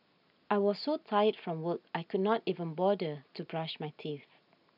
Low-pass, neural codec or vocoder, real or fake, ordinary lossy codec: 5.4 kHz; none; real; none